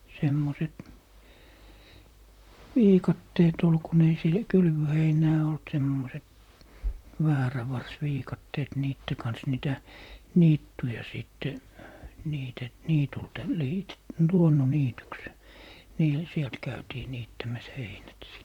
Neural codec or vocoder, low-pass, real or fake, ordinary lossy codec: vocoder, 44.1 kHz, 128 mel bands, Pupu-Vocoder; 19.8 kHz; fake; none